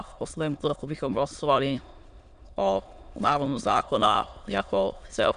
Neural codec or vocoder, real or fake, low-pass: autoencoder, 22.05 kHz, a latent of 192 numbers a frame, VITS, trained on many speakers; fake; 9.9 kHz